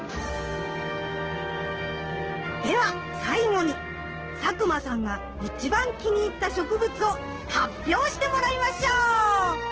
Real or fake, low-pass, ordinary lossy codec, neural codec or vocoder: fake; 7.2 kHz; Opus, 16 kbps; codec, 16 kHz, 6 kbps, DAC